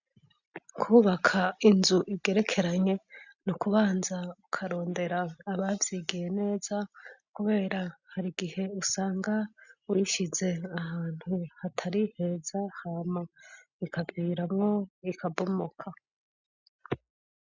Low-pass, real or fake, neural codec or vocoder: 7.2 kHz; real; none